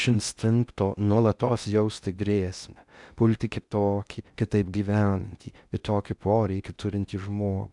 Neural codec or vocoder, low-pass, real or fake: codec, 16 kHz in and 24 kHz out, 0.6 kbps, FocalCodec, streaming, 2048 codes; 10.8 kHz; fake